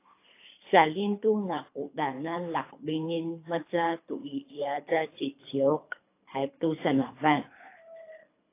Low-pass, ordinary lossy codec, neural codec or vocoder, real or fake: 3.6 kHz; AAC, 24 kbps; codec, 24 kHz, 3 kbps, HILCodec; fake